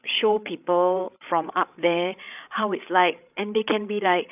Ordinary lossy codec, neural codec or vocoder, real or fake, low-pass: none; codec, 16 kHz, 8 kbps, FreqCodec, larger model; fake; 3.6 kHz